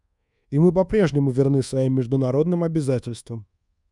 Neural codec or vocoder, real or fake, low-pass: codec, 24 kHz, 1.2 kbps, DualCodec; fake; 10.8 kHz